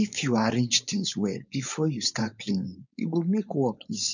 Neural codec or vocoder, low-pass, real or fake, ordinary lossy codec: codec, 16 kHz, 4.8 kbps, FACodec; 7.2 kHz; fake; none